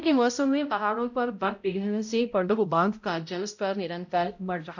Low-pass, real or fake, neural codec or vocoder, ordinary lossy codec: 7.2 kHz; fake; codec, 16 kHz, 0.5 kbps, X-Codec, HuBERT features, trained on balanced general audio; none